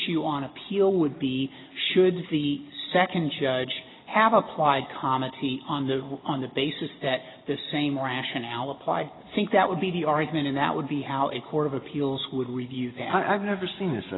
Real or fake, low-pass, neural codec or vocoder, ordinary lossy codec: real; 7.2 kHz; none; AAC, 16 kbps